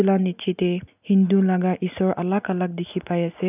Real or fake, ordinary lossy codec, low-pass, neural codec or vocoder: real; none; 3.6 kHz; none